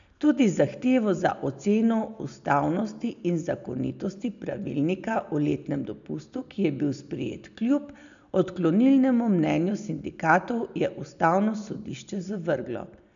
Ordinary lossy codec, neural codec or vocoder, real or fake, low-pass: none; none; real; 7.2 kHz